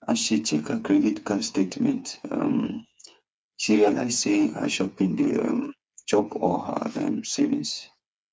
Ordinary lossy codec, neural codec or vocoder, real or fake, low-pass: none; codec, 16 kHz, 4 kbps, FreqCodec, smaller model; fake; none